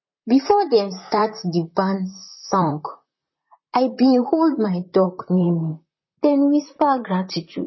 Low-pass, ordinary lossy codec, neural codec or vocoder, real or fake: 7.2 kHz; MP3, 24 kbps; vocoder, 44.1 kHz, 128 mel bands, Pupu-Vocoder; fake